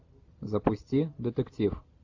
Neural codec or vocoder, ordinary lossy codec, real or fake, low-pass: none; AAC, 48 kbps; real; 7.2 kHz